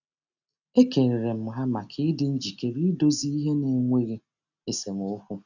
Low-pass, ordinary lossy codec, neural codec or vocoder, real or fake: 7.2 kHz; none; none; real